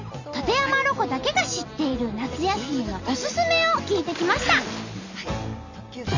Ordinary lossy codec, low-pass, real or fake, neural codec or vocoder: none; 7.2 kHz; real; none